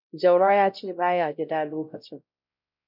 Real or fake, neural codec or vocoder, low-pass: fake; codec, 16 kHz, 0.5 kbps, X-Codec, WavLM features, trained on Multilingual LibriSpeech; 5.4 kHz